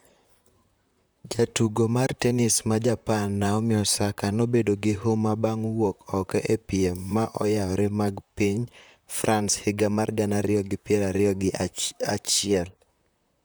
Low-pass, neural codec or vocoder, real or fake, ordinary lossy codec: none; vocoder, 44.1 kHz, 128 mel bands, Pupu-Vocoder; fake; none